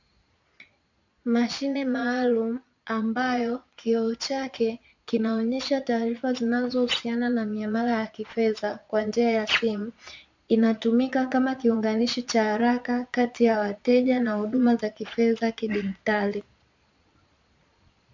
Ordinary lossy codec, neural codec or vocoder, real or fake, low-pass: MP3, 64 kbps; vocoder, 22.05 kHz, 80 mel bands, Vocos; fake; 7.2 kHz